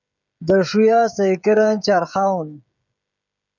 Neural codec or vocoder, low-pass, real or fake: codec, 16 kHz, 16 kbps, FreqCodec, smaller model; 7.2 kHz; fake